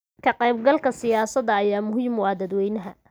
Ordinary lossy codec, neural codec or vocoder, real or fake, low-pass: none; none; real; none